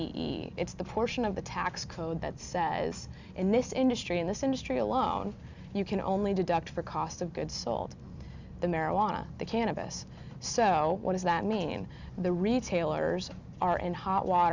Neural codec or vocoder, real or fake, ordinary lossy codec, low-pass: none; real; Opus, 64 kbps; 7.2 kHz